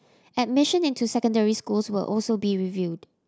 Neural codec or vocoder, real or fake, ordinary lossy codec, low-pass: none; real; none; none